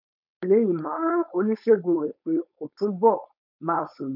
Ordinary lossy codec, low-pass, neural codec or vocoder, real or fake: none; 5.4 kHz; codec, 16 kHz, 4.8 kbps, FACodec; fake